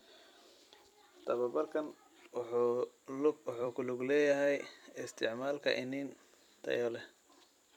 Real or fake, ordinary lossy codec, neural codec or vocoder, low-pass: fake; none; vocoder, 44.1 kHz, 128 mel bands every 256 samples, BigVGAN v2; 19.8 kHz